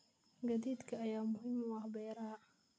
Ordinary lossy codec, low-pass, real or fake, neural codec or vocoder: none; none; real; none